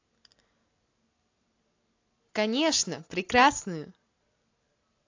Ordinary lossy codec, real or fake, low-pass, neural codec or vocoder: AAC, 48 kbps; real; 7.2 kHz; none